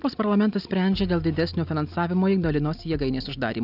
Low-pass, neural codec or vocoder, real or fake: 5.4 kHz; none; real